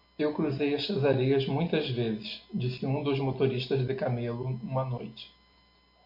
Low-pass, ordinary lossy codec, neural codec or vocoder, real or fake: 5.4 kHz; MP3, 32 kbps; none; real